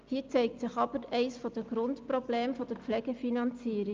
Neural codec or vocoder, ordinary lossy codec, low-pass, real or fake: none; Opus, 24 kbps; 7.2 kHz; real